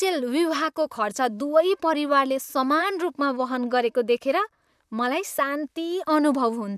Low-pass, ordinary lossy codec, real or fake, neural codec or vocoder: 14.4 kHz; none; fake; vocoder, 44.1 kHz, 128 mel bands, Pupu-Vocoder